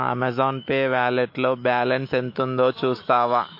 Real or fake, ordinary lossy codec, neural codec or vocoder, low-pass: fake; MP3, 32 kbps; autoencoder, 48 kHz, 128 numbers a frame, DAC-VAE, trained on Japanese speech; 5.4 kHz